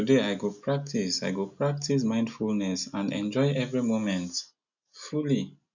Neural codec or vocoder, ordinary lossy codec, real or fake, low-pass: none; none; real; 7.2 kHz